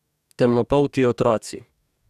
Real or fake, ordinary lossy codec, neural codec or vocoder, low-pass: fake; none; codec, 44.1 kHz, 2.6 kbps, DAC; 14.4 kHz